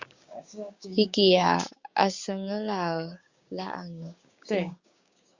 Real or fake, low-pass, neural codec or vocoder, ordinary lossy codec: fake; 7.2 kHz; codec, 16 kHz, 6 kbps, DAC; Opus, 64 kbps